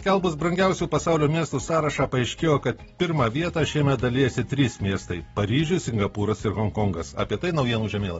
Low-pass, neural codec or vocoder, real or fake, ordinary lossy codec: 10.8 kHz; none; real; AAC, 24 kbps